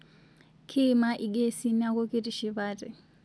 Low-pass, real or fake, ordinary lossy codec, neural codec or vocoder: none; real; none; none